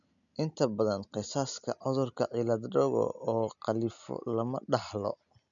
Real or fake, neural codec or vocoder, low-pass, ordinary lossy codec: real; none; 7.2 kHz; none